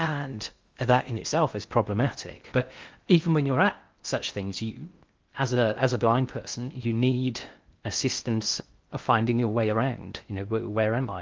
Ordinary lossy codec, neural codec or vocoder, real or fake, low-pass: Opus, 32 kbps; codec, 16 kHz in and 24 kHz out, 0.6 kbps, FocalCodec, streaming, 2048 codes; fake; 7.2 kHz